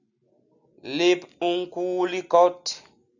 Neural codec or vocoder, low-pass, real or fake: none; 7.2 kHz; real